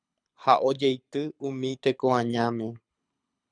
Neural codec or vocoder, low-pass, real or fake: codec, 24 kHz, 6 kbps, HILCodec; 9.9 kHz; fake